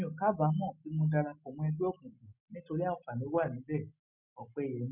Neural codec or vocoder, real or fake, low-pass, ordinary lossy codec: none; real; 3.6 kHz; none